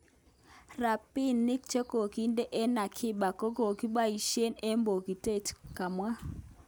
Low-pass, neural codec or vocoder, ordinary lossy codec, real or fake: none; none; none; real